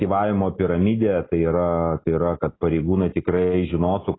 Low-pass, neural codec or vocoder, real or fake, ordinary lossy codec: 7.2 kHz; none; real; AAC, 16 kbps